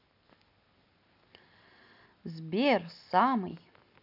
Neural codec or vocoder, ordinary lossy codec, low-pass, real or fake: none; none; 5.4 kHz; real